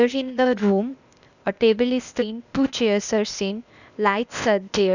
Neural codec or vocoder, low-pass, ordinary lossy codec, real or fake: codec, 16 kHz, 0.8 kbps, ZipCodec; 7.2 kHz; none; fake